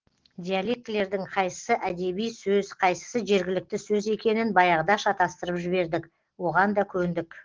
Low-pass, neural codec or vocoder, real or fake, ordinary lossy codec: 7.2 kHz; none; real; Opus, 16 kbps